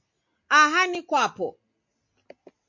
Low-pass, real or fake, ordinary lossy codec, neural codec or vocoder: 7.2 kHz; real; MP3, 48 kbps; none